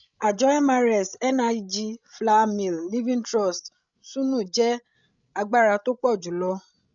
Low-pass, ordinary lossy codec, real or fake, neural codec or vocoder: 7.2 kHz; none; fake; codec, 16 kHz, 16 kbps, FreqCodec, larger model